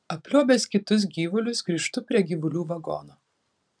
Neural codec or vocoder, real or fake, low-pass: vocoder, 44.1 kHz, 128 mel bands every 512 samples, BigVGAN v2; fake; 9.9 kHz